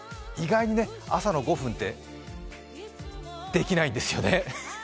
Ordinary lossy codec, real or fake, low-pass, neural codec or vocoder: none; real; none; none